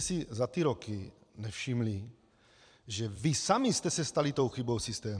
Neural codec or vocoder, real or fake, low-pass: vocoder, 44.1 kHz, 128 mel bands every 256 samples, BigVGAN v2; fake; 9.9 kHz